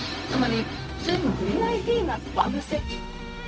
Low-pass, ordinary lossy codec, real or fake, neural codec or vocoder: none; none; fake; codec, 16 kHz, 0.4 kbps, LongCat-Audio-Codec